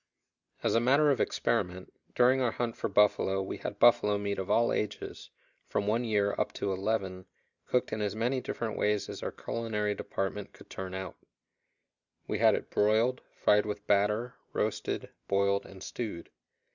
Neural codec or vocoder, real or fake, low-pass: none; real; 7.2 kHz